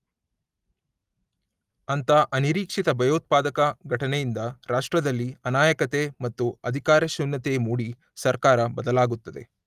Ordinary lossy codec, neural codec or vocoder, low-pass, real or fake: Opus, 32 kbps; none; 14.4 kHz; real